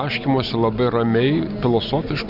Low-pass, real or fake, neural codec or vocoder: 5.4 kHz; real; none